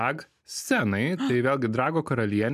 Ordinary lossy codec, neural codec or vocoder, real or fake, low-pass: MP3, 96 kbps; none; real; 14.4 kHz